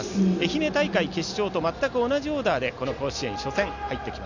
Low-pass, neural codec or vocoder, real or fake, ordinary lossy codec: 7.2 kHz; none; real; none